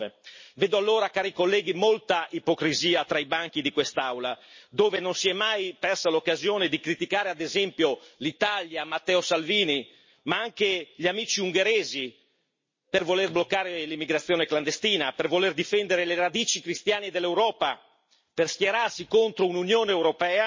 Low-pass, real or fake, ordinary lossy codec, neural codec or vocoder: 7.2 kHz; real; MP3, 32 kbps; none